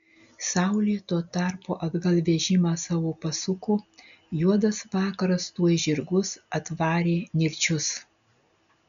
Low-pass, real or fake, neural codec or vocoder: 7.2 kHz; real; none